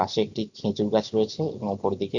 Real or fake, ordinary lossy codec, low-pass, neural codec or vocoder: real; none; 7.2 kHz; none